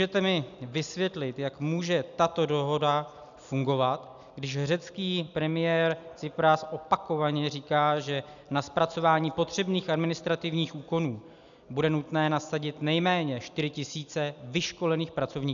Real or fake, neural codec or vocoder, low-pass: real; none; 7.2 kHz